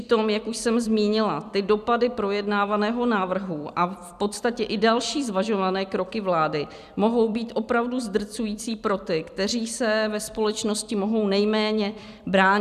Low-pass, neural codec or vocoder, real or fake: 14.4 kHz; vocoder, 44.1 kHz, 128 mel bands every 256 samples, BigVGAN v2; fake